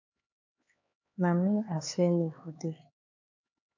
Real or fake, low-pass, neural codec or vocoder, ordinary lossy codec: fake; 7.2 kHz; codec, 16 kHz, 4 kbps, X-Codec, HuBERT features, trained on LibriSpeech; AAC, 48 kbps